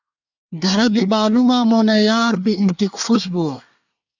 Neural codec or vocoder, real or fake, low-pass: codec, 24 kHz, 1 kbps, SNAC; fake; 7.2 kHz